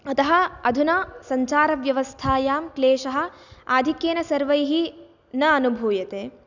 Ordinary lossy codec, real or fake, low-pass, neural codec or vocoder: none; real; 7.2 kHz; none